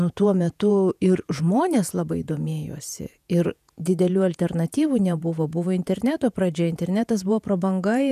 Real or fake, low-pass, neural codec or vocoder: fake; 14.4 kHz; vocoder, 48 kHz, 128 mel bands, Vocos